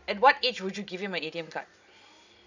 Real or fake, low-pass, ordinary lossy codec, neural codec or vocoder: real; 7.2 kHz; none; none